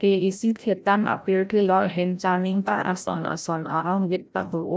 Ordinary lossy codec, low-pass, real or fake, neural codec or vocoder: none; none; fake; codec, 16 kHz, 0.5 kbps, FreqCodec, larger model